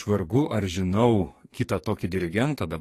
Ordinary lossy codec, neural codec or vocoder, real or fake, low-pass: AAC, 48 kbps; codec, 44.1 kHz, 2.6 kbps, SNAC; fake; 14.4 kHz